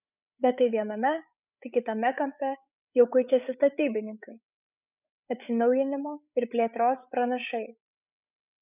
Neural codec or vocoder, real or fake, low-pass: codec, 16 kHz, 8 kbps, FreqCodec, larger model; fake; 3.6 kHz